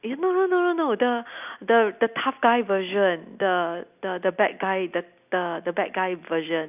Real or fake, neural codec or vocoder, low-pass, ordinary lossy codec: real; none; 3.6 kHz; none